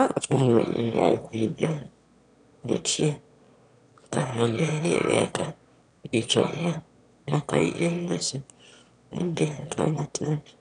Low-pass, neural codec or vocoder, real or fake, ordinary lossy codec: 9.9 kHz; autoencoder, 22.05 kHz, a latent of 192 numbers a frame, VITS, trained on one speaker; fake; none